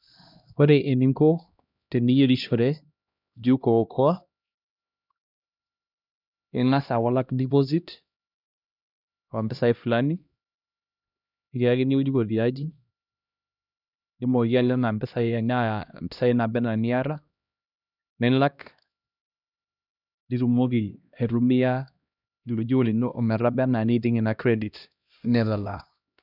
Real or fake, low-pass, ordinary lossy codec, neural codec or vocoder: fake; 5.4 kHz; none; codec, 16 kHz, 1 kbps, X-Codec, HuBERT features, trained on LibriSpeech